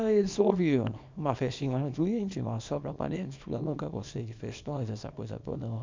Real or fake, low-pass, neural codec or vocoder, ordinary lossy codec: fake; 7.2 kHz; codec, 24 kHz, 0.9 kbps, WavTokenizer, small release; MP3, 64 kbps